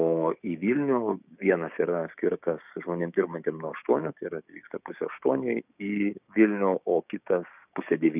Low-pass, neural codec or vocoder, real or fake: 3.6 kHz; codec, 16 kHz, 6 kbps, DAC; fake